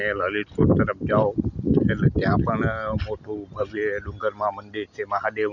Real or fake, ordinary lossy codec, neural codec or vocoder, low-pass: real; none; none; 7.2 kHz